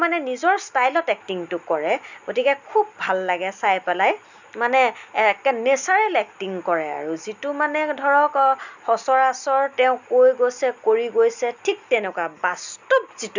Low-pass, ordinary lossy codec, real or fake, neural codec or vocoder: 7.2 kHz; none; real; none